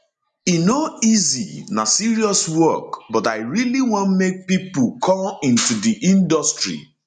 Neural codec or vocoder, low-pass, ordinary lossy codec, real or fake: none; 10.8 kHz; none; real